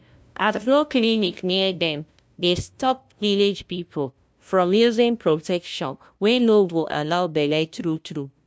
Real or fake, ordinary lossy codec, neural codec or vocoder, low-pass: fake; none; codec, 16 kHz, 0.5 kbps, FunCodec, trained on LibriTTS, 25 frames a second; none